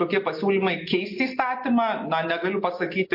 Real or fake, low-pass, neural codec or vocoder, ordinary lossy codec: real; 5.4 kHz; none; MP3, 32 kbps